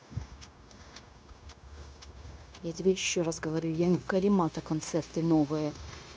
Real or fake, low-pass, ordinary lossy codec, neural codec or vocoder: fake; none; none; codec, 16 kHz, 0.9 kbps, LongCat-Audio-Codec